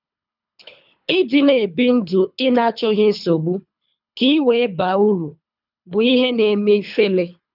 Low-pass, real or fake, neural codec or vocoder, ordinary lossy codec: 5.4 kHz; fake; codec, 24 kHz, 3 kbps, HILCodec; none